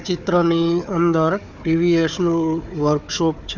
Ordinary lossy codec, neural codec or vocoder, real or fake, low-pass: none; codec, 16 kHz, 4 kbps, FunCodec, trained on Chinese and English, 50 frames a second; fake; 7.2 kHz